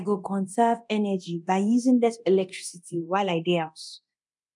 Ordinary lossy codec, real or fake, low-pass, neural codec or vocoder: none; fake; 10.8 kHz; codec, 24 kHz, 0.9 kbps, DualCodec